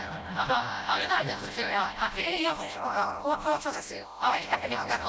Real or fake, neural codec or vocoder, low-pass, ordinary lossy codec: fake; codec, 16 kHz, 0.5 kbps, FreqCodec, smaller model; none; none